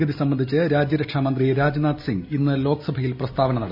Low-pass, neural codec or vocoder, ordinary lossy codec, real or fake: 5.4 kHz; none; AAC, 48 kbps; real